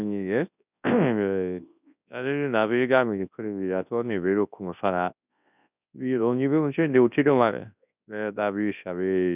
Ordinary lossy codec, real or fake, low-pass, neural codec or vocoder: none; fake; 3.6 kHz; codec, 24 kHz, 0.9 kbps, WavTokenizer, large speech release